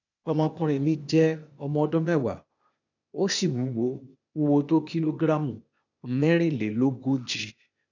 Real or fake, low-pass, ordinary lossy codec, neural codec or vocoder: fake; 7.2 kHz; none; codec, 16 kHz, 0.8 kbps, ZipCodec